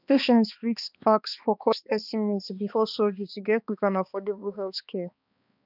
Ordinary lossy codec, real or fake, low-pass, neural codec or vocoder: none; fake; 5.4 kHz; codec, 16 kHz, 2 kbps, X-Codec, HuBERT features, trained on balanced general audio